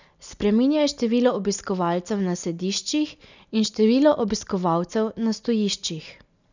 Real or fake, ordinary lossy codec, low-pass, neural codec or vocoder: real; none; 7.2 kHz; none